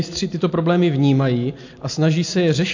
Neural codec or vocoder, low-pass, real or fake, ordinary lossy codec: none; 7.2 kHz; real; AAC, 48 kbps